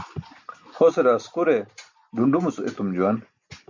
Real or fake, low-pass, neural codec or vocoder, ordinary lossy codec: real; 7.2 kHz; none; MP3, 64 kbps